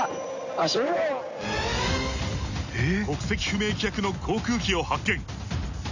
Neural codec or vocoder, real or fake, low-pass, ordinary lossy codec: none; real; 7.2 kHz; none